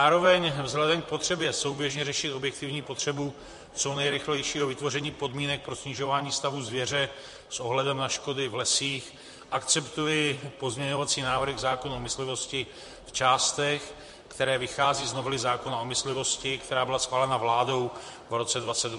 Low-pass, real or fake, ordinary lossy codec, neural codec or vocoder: 14.4 kHz; fake; MP3, 48 kbps; vocoder, 44.1 kHz, 128 mel bands, Pupu-Vocoder